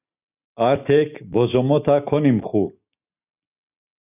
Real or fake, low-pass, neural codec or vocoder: real; 3.6 kHz; none